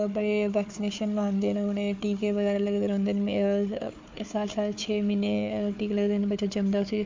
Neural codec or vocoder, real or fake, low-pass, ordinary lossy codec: codec, 16 kHz, 4 kbps, FunCodec, trained on Chinese and English, 50 frames a second; fake; 7.2 kHz; MP3, 48 kbps